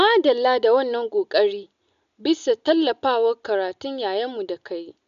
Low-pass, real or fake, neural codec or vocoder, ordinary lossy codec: 7.2 kHz; real; none; AAC, 96 kbps